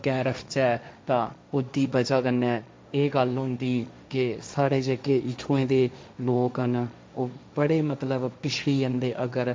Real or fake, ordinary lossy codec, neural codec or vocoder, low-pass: fake; none; codec, 16 kHz, 1.1 kbps, Voila-Tokenizer; none